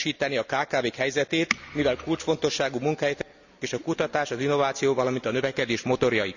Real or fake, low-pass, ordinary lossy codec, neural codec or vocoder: real; 7.2 kHz; none; none